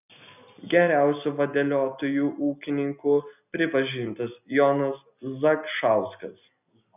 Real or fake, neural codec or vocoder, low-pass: real; none; 3.6 kHz